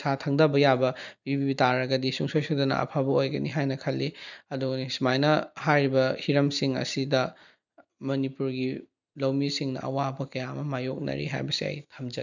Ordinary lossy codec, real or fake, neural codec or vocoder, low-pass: none; real; none; 7.2 kHz